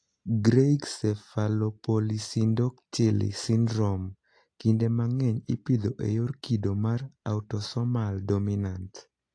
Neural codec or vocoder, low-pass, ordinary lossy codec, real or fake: none; 9.9 kHz; AAC, 48 kbps; real